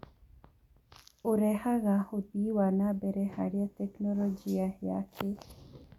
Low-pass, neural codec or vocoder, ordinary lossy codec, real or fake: 19.8 kHz; none; none; real